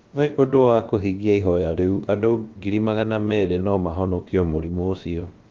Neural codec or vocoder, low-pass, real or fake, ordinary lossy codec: codec, 16 kHz, about 1 kbps, DyCAST, with the encoder's durations; 7.2 kHz; fake; Opus, 32 kbps